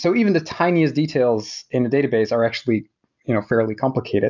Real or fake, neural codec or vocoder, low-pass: real; none; 7.2 kHz